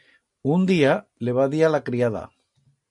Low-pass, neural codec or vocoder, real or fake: 10.8 kHz; none; real